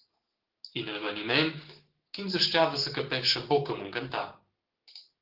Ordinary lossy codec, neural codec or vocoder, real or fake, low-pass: Opus, 16 kbps; codec, 16 kHz, 6 kbps, DAC; fake; 5.4 kHz